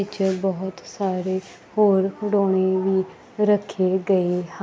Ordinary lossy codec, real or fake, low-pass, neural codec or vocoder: none; real; none; none